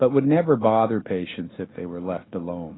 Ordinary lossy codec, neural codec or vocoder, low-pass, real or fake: AAC, 16 kbps; none; 7.2 kHz; real